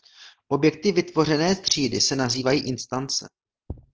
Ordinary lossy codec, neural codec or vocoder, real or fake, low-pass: Opus, 16 kbps; none; real; 7.2 kHz